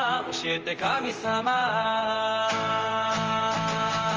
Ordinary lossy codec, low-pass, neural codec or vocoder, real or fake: Opus, 24 kbps; 7.2 kHz; vocoder, 44.1 kHz, 128 mel bands, Pupu-Vocoder; fake